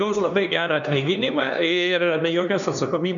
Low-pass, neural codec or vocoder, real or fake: 7.2 kHz; codec, 16 kHz, 2 kbps, X-Codec, HuBERT features, trained on LibriSpeech; fake